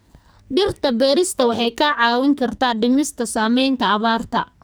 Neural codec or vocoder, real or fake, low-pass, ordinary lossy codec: codec, 44.1 kHz, 2.6 kbps, SNAC; fake; none; none